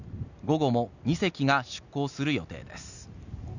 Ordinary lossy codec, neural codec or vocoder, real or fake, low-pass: none; none; real; 7.2 kHz